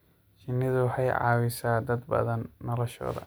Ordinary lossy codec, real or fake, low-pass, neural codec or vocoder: none; real; none; none